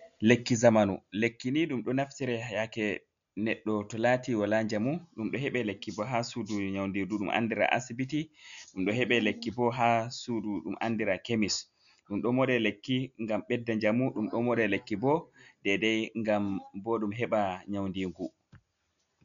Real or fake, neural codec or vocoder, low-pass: real; none; 7.2 kHz